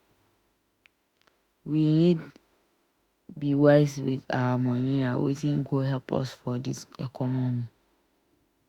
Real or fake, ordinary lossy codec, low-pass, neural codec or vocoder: fake; Opus, 64 kbps; 19.8 kHz; autoencoder, 48 kHz, 32 numbers a frame, DAC-VAE, trained on Japanese speech